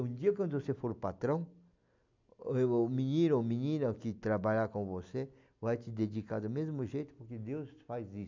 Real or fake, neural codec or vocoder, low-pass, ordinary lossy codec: real; none; 7.2 kHz; MP3, 64 kbps